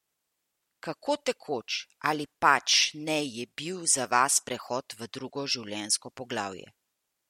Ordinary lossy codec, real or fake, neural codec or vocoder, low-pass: MP3, 64 kbps; real; none; 19.8 kHz